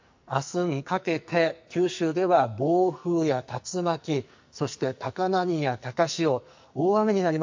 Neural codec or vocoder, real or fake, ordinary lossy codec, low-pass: codec, 44.1 kHz, 2.6 kbps, SNAC; fake; MP3, 48 kbps; 7.2 kHz